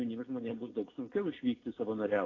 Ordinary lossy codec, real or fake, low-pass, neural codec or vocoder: AAC, 32 kbps; real; 7.2 kHz; none